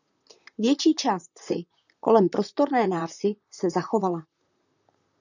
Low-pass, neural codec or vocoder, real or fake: 7.2 kHz; vocoder, 44.1 kHz, 128 mel bands, Pupu-Vocoder; fake